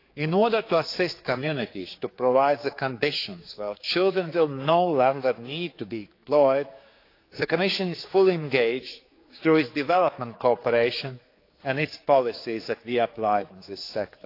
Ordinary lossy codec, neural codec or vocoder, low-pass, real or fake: AAC, 32 kbps; codec, 16 kHz, 4 kbps, X-Codec, HuBERT features, trained on general audio; 5.4 kHz; fake